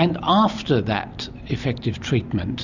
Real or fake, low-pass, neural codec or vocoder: real; 7.2 kHz; none